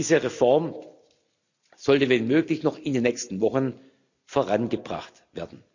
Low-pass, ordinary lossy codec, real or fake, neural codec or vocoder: 7.2 kHz; none; real; none